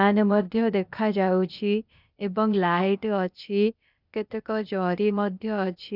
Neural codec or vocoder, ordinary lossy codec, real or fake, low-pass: codec, 16 kHz, 0.8 kbps, ZipCodec; none; fake; 5.4 kHz